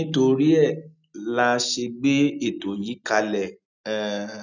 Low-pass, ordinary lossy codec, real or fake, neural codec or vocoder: 7.2 kHz; none; real; none